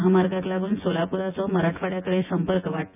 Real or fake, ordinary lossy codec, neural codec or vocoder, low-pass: fake; none; vocoder, 24 kHz, 100 mel bands, Vocos; 3.6 kHz